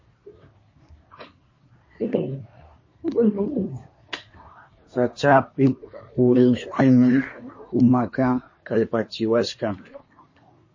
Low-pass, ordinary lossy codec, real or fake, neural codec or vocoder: 7.2 kHz; MP3, 32 kbps; fake; codec, 24 kHz, 1 kbps, SNAC